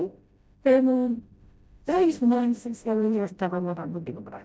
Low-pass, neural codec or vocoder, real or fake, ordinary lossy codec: none; codec, 16 kHz, 0.5 kbps, FreqCodec, smaller model; fake; none